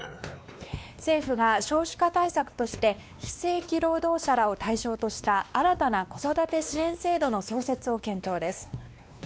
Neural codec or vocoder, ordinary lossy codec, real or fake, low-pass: codec, 16 kHz, 2 kbps, X-Codec, WavLM features, trained on Multilingual LibriSpeech; none; fake; none